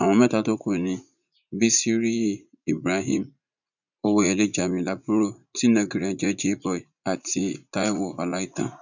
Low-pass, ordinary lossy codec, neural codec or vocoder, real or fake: 7.2 kHz; none; vocoder, 44.1 kHz, 80 mel bands, Vocos; fake